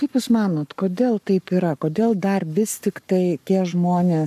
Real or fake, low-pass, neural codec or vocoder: fake; 14.4 kHz; codec, 44.1 kHz, 7.8 kbps, Pupu-Codec